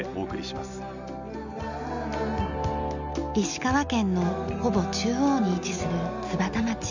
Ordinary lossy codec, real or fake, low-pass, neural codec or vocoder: none; real; 7.2 kHz; none